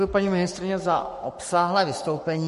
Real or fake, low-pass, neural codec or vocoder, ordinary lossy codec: fake; 14.4 kHz; codec, 44.1 kHz, 7.8 kbps, Pupu-Codec; MP3, 48 kbps